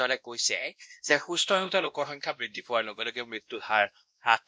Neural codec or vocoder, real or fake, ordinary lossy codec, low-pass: codec, 16 kHz, 1 kbps, X-Codec, WavLM features, trained on Multilingual LibriSpeech; fake; none; none